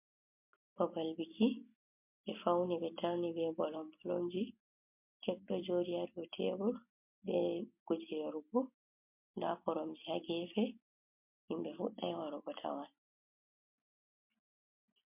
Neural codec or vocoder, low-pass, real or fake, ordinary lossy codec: none; 3.6 kHz; real; MP3, 32 kbps